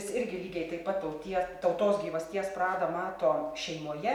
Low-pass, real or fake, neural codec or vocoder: 19.8 kHz; real; none